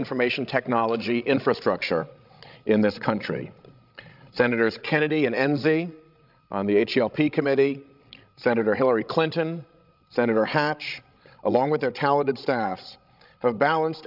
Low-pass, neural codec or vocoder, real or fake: 5.4 kHz; codec, 16 kHz, 16 kbps, FreqCodec, larger model; fake